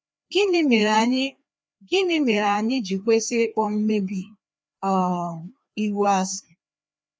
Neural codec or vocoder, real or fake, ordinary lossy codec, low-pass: codec, 16 kHz, 2 kbps, FreqCodec, larger model; fake; none; none